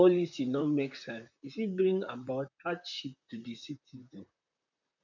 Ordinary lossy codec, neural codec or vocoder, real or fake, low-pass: none; vocoder, 44.1 kHz, 128 mel bands, Pupu-Vocoder; fake; 7.2 kHz